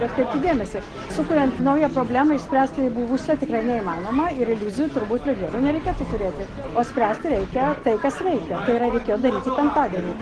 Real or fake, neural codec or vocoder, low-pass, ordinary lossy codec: real; none; 10.8 kHz; Opus, 16 kbps